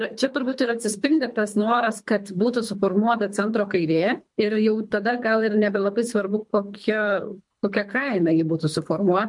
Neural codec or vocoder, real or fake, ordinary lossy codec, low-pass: codec, 24 kHz, 3 kbps, HILCodec; fake; MP3, 64 kbps; 10.8 kHz